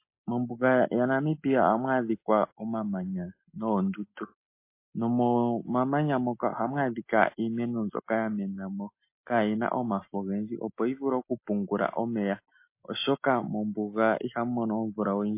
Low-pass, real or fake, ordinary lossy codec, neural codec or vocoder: 3.6 kHz; real; MP3, 24 kbps; none